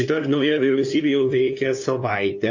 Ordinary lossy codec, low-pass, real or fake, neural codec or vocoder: AAC, 48 kbps; 7.2 kHz; fake; codec, 16 kHz, 2 kbps, FunCodec, trained on LibriTTS, 25 frames a second